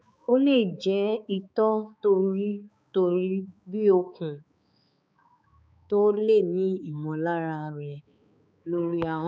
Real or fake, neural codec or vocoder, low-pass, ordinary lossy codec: fake; codec, 16 kHz, 4 kbps, X-Codec, HuBERT features, trained on balanced general audio; none; none